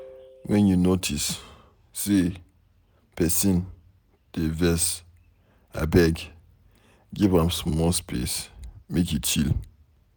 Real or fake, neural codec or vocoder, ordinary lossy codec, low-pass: real; none; none; none